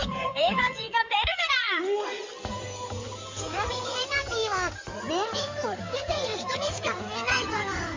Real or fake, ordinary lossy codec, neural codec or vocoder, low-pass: fake; MP3, 48 kbps; codec, 16 kHz in and 24 kHz out, 2.2 kbps, FireRedTTS-2 codec; 7.2 kHz